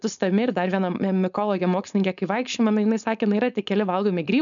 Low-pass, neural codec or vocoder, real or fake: 7.2 kHz; codec, 16 kHz, 4.8 kbps, FACodec; fake